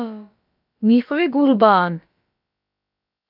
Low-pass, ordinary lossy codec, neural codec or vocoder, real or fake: 5.4 kHz; AAC, 48 kbps; codec, 16 kHz, about 1 kbps, DyCAST, with the encoder's durations; fake